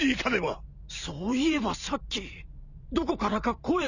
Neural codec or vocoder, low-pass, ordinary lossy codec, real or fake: vocoder, 44.1 kHz, 128 mel bands every 512 samples, BigVGAN v2; 7.2 kHz; none; fake